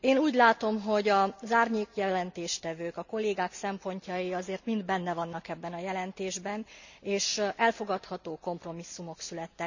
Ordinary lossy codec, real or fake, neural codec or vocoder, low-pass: none; real; none; 7.2 kHz